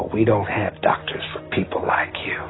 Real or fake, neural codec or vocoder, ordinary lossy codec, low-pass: real; none; AAC, 16 kbps; 7.2 kHz